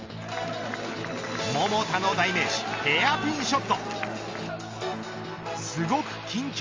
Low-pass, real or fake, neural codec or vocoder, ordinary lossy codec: 7.2 kHz; real; none; Opus, 32 kbps